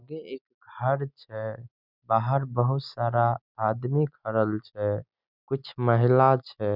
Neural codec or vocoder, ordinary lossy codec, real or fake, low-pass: none; none; real; 5.4 kHz